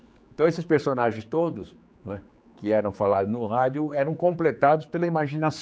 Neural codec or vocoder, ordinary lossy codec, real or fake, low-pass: codec, 16 kHz, 4 kbps, X-Codec, HuBERT features, trained on general audio; none; fake; none